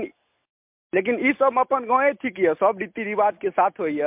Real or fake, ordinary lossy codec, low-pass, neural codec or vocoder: real; MP3, 32 kbps; 3.6 kHz; none